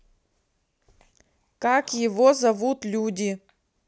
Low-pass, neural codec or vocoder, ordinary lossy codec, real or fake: none; none; none; real